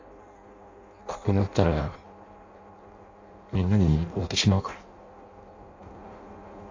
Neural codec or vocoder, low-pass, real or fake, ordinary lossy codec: codec, 16 kHz in and 24 kHz out, 0.6 kbps, FireRedTTS-2 codec; 7.2 kHz; fake; AAC, 48 kbps